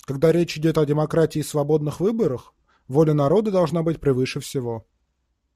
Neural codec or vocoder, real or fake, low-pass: none; real; 14.4 kHz